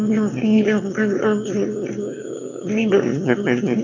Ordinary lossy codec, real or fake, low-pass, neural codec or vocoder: none; fake; 7.2 kHz; autoencoder, 22.05 kHz, a latent of 192 numbers a frame, VITS, trained on one speaker